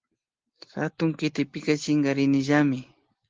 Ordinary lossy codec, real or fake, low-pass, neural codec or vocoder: Opus, 16 kbps; real; 7.2 kHz; none